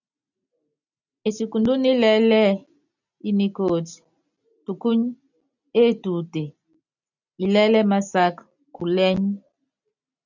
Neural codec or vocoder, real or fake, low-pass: none; real; 7.2 kHz